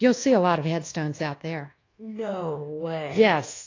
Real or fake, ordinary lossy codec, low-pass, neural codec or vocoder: fake; AAC, 32 kbps; 7.2 kHz; codec, 24 kHz, 1.2 kbps, DualCodec